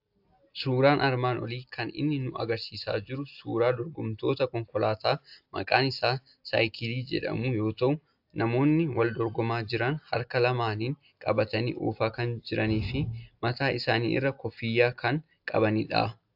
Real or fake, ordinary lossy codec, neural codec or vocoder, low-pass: real; AAC, 48 kbps; none; 5.4 kHz